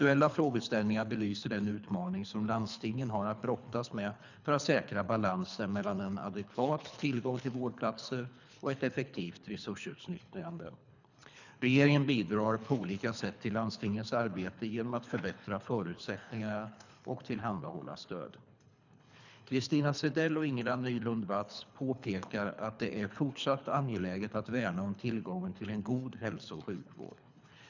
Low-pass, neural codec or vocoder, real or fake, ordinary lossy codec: 7.2 kHz; codec, 24 kHz, 3 kbps, HILCodec; fake; none